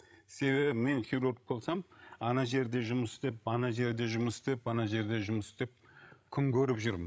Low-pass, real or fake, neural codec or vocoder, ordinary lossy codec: none; fake; codec, 16 kHz, 16 kbps, FreqCodec, larger model; none